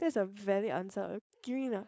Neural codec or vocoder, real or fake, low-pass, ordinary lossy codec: codec, 16 kHz, 4.8 kbps, FACodec; fake; none; none